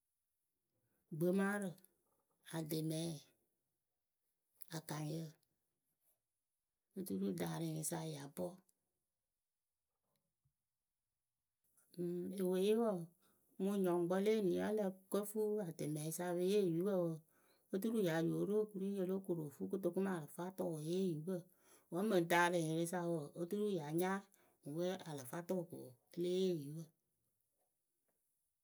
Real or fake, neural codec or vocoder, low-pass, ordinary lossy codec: real; none; none; none